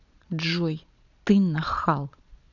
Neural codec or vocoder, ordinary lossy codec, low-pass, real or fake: vocoder, 22.05 kHz, 80 mel bands, Vocos; none; 7.2 kHz; fake